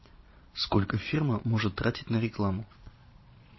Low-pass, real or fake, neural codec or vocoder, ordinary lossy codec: 7.2 kHz; fake; vocoder, 22.05 kHz, 80 mel bands, Vocos; MP3, 24 kbps